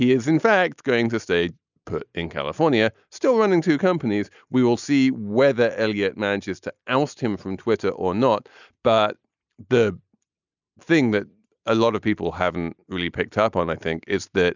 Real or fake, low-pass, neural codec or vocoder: real; 7.2 kHz; none